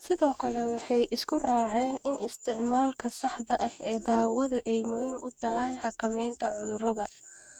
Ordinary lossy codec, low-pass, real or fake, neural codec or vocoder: none; 19.8 kHz; fake; codec, 44.1 kHz, 2.6 kbps, DAC